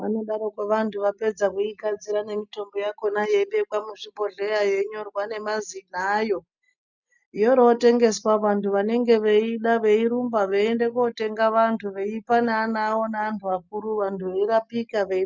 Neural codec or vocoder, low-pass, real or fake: none; 7.2 kHz; real